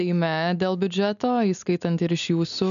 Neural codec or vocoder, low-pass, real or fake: none; 7.2 kHz; real